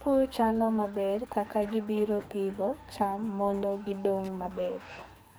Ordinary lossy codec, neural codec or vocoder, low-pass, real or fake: none; codec, 44.1 kHz, 2.6 kbps, SNAC; none; fake